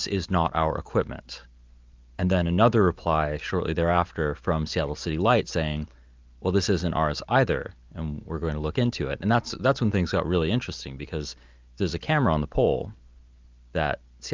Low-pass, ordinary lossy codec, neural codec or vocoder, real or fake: 7.2 kHz; Opus, 24 kbps; none; real